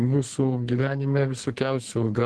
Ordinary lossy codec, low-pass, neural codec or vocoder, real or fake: Opus, 16 kbps; 10.8 kHz; codec, 32 kHz, 1.9 kbps, SNAC; fake